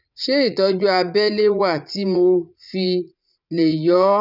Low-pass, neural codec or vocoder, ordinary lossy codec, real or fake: 5.4 kHz; vocoder, 44.1 kHz, 80 mel bands, Vocos; none; fake